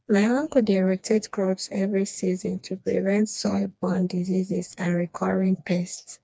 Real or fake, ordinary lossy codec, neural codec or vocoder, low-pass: fake; none; codec, 16 kHz, 2 kbps, FreqCodec, smaller model; none